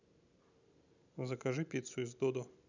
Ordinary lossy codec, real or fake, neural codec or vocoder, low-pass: none; real; none; 7.2 kHz